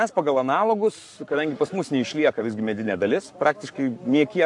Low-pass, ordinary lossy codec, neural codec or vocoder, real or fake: 10.8 kHz; AAC, 64 kbps; codec, 44.1 kHz, 7.8 kbps, Pupu-Codec; fake